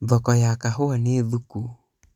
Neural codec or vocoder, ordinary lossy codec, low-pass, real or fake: vocoder, 44.1 kHz, 128 mel bands every 256 samples, BigVGAN v2; none; 19.8 kHz; fake